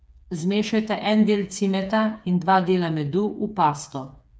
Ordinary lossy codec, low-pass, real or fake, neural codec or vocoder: none; none; fake; codec, 16 kHz, 4 kbps, FreqCodec, smaller model